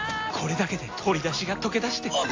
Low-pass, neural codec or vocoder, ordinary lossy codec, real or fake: 7.2 kHz; none; AAC, 32 kbps; real